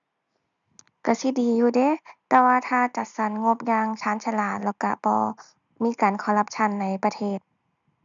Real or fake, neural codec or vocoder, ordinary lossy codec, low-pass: real; none; none; 7.2 kHz